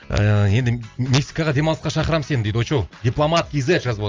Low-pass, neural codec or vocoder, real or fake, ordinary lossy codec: 7.2 kHz; none; real; Opus, 32 kbps